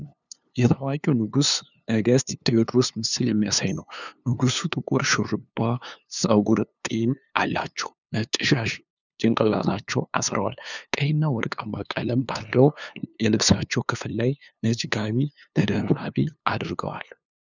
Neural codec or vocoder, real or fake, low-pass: codec, 16 kHz, 2 kbps, FunCodec, trained on LibriTTS, 25 frames a second; fake; 7.2 kHz